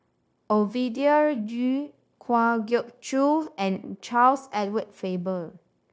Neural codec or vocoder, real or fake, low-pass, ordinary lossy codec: codec, 16 kHz, 0.9 kbps, LongCat-Audio-Codec; fake; none; none